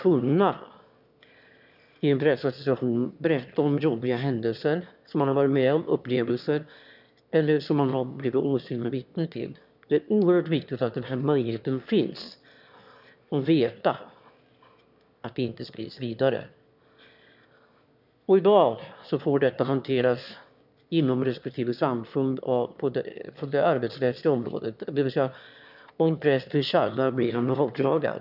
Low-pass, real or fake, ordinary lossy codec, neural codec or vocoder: 5.4 kHz; fake; none; autoencoder, 22.05 kHz, a latent of 192 numbers a frame, VITS, trained on one speaker